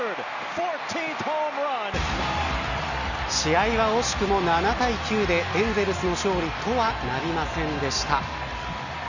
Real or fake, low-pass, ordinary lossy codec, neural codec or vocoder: real; 7.2 kHz; none; none